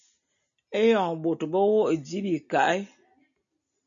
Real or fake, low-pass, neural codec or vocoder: real; 7.2 kHz; none